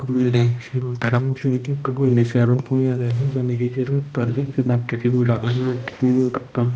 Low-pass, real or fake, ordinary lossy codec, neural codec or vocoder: none; fake; none; codec, 16 kHz, 1 kbps, X-Codec, HuBERT features, trained on balanced general audio